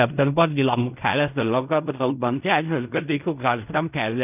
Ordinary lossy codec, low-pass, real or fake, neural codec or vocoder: none; 3.6 kHz; fake; codec, 16 kHz in and 24 kHz out, 0.4 kbps, LongCat-Audio-Codec, fine tuned four codebook decoder